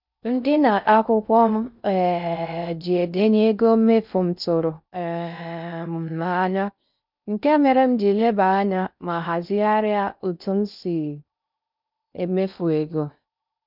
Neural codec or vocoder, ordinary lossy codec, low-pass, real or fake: codec, 16 kHz in and 24 kHz out, 0.6 kbps, FocalCodec, streaming, 4096 codes; none; 5.4 kHz; fake